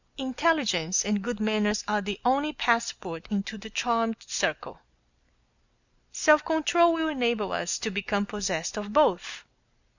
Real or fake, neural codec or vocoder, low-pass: real; none; 7.2 kHz